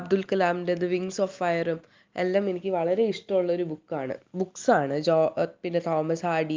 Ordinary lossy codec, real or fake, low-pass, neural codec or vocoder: Opus, 24 kbps; real; 7.2 kHz; none